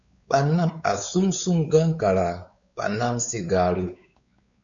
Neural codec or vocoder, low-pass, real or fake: codec, 16 kHz, 4 kbps, X-Codec, WavLM features, trained on Multilingual LibriSpeech; 7.2 kHz; fake